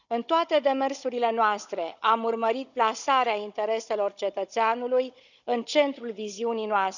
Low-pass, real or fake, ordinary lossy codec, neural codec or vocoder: 7.2 kHz; fake; none; codec, 16 kHz, 16 kbps, FunCodec, trained on Chinese and English, 50 frames a second